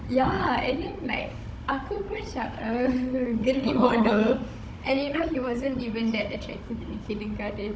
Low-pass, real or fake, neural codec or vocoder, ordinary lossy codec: none; fake; codec, 16 kHz, 16 kbps, FunCodec, trained on Chinese and English, 50 frames a second; none